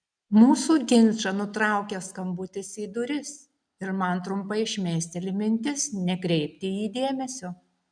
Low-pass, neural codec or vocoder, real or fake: 9.9 kHz; none; real